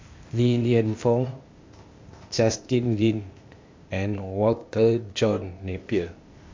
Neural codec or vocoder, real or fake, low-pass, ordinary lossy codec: codec, 16 kHz, 0.8 kbps, ZipCodec; fake; 7.2 kHz; MP3, 48 kbps